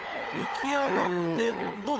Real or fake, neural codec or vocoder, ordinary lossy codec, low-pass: fake; codec, 16 kHz, 16 kbps, FunCodec, trained on LibriTTS, 50 frames a second; none; none